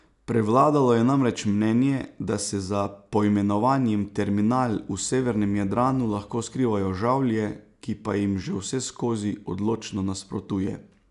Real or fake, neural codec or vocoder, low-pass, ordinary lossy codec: real; none; 10.8 kHz; none